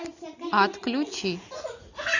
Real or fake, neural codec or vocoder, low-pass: real; none; 7.2 kHz